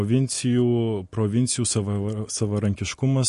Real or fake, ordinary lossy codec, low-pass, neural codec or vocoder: real; MP3, 48 kbps; 14.4 kHz; none